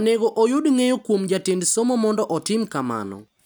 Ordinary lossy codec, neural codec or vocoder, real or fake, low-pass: none; none; real; none